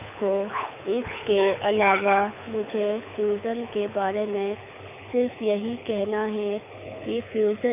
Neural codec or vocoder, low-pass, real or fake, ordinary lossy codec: codec, 24 kHz, 6 kbps, HILCodec; 3.6 kHz; fake; none